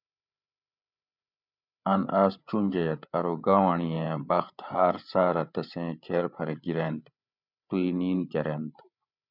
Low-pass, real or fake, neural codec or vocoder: 5.4 kHz; fake; codec, 16 kHz, 8 kbps, FreqCodec, larger model